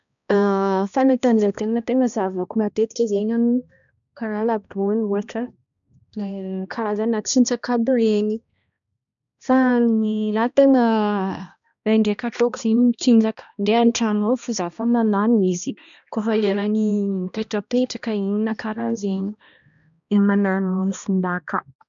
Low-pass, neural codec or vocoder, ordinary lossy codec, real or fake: 7.2 kHz; codec, 16 kHz, 1 kbps, X-Codec, HuBERT features, trained on balanced general audio; none; fake